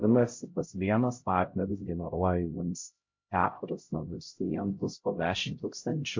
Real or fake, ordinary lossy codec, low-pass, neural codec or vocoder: fake; MP3, 48 kbps; 7.2 kHz; codec, 16 kHz, 0.5 kbps, X-Codec, HuBERT features, trained on LibriSpeech